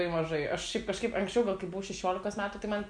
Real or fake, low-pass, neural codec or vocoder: real; 9.9 kHz; none